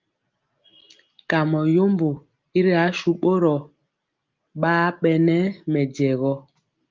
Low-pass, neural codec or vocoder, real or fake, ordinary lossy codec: 7.2 kHz; none; real; Opus, 32 kbps